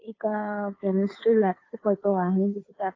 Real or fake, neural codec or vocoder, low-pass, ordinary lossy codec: fake; codec, 16 kHz, 2 kbps, FunCodec, trained on Chinese and English, 25 frames a second; 7.2 kHz; AAC, 32 kbps